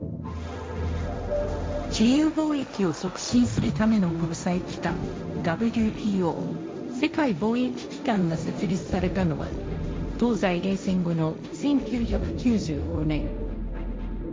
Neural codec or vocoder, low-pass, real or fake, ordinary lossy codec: codec, 16 kHz, 1.1 kbps, Voila-Tokenizer; none; fake; none